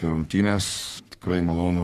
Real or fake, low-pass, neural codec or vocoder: fake; 14.4 kHz; codec, 44.1 kHz, 3.4 kbps, Pupu-Codec